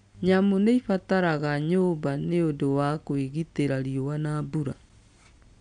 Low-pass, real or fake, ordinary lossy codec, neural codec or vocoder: 9.9 kHz; real; none; none